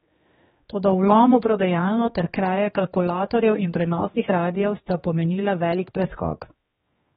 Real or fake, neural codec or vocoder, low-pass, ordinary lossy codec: fake; codec, 16 kHz, 2 kbps, X-Codec, HuBERT features, trained on general audio; 7.2 kHz; AAC, 16 kbps